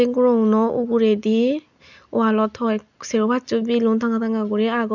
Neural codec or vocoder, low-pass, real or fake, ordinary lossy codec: none; 7.2 kHz; real; none